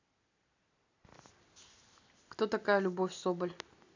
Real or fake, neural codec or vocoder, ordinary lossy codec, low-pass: real; none; AAC, 48 kbps; 7.2 kHz